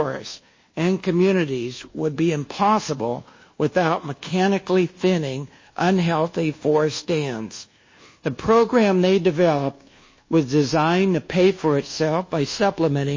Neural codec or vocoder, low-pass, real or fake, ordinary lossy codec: codec, 24 kHz, 1.2 kbps, DualCodec; 7.2 kHz; fake; MP3, 32 kbps